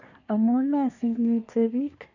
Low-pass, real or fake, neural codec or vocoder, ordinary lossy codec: 7.2 kHz; fake; codec, 24 kHz, 1 kbps, SNAC; none